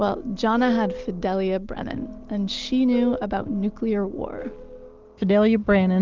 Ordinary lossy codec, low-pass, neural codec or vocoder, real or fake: Opus, 32 kbps; 7.2 kHz; none; real